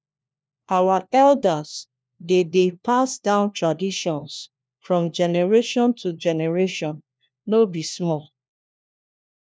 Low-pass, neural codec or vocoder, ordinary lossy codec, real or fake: none; codec, 16 kHz, 1 kbps, FunCodec, trained on LibriTTS, 50 frames a second; none; fake